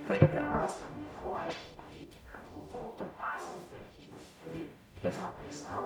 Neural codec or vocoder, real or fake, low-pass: codec, 44.1 kHz, 0.9 kbps, DAC; fake; 19.8 kHz